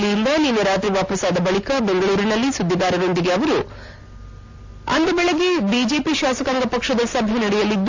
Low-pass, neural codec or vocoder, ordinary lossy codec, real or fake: 7.2 kHz; none; AAC, 48 kbps; real